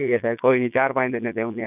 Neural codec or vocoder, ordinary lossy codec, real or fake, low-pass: vocoder, 22.05 kHz, 80 mel bands, Vocos; none; fake; 3.6 kHz